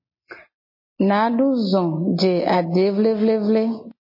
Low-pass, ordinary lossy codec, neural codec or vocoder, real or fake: 5.4 kHz; MP3, 24 kbps; none; real